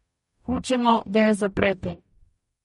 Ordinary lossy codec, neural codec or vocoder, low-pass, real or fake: MP3, 48 kbps; codec, 44.1 kHz, 0.9 kbps, DAC; 19.8 kHz; fake